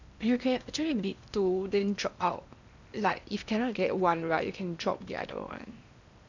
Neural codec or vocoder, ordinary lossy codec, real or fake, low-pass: codec, 16 kHz in and 24 kHz out, 0.8 kbps, FocalCodec, streaming, 65536 codes; none; fake; 7.2 kHz